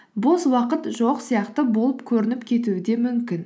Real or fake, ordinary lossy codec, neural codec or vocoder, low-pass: real; none; none; none